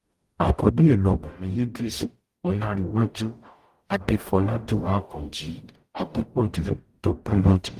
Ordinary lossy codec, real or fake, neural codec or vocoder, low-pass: Opus, 24 kbps; fake; codec, 44.1 kHz, 0.9 kbps, DAC; 14.4 kHz